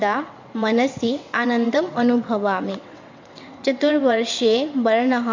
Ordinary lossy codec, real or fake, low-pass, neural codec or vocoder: MP3, 48 kbps; fake; 7.2 kHz; vocoder, 22.05 kHz, 80 mel bands, WaveNeXt